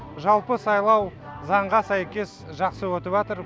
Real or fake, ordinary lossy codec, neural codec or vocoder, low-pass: real; none; none; none